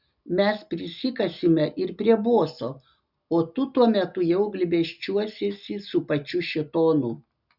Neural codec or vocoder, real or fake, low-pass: none; real; 5.4 kHz